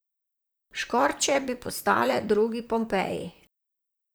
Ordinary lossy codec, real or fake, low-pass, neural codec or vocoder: none; fake; none; vocoder, 44.1 kHz, 128 mel bands, Pupu-Vocoder